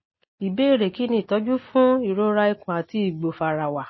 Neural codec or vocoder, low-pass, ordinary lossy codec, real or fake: none; 7.2 kHz; MP3, 24 kbps; real